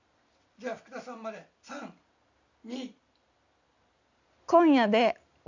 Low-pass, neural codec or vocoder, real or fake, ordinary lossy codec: 7.2 kHz; none; real; none